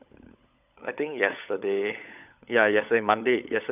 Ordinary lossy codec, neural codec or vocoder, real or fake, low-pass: none; codec, 16 kHz, 16 kbps, FunCodec, trained on LibriTTS, 50 frames a second; fake; 3.6 kHz